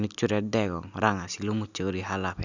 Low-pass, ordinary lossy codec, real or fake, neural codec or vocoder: 7.2 kHz; none; real; none